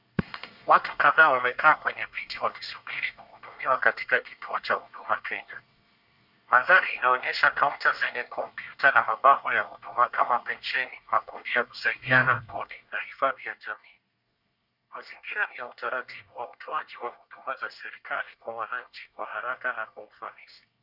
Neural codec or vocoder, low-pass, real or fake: codec, 24 kHz, 1 kbps, SNAC; 5.4 kHz; fake